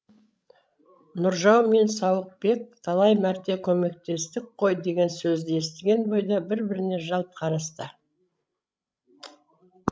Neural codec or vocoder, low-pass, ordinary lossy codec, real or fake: codec, 16 kHz, 16 kbps, FreqCodec, larger model; none; none; fake